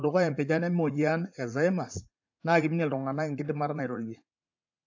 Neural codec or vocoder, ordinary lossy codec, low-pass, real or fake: vocoder, 44.1 kHz, 80 mel bands, Vocos; AAC, 48 kbps; 7.2 kHz; fake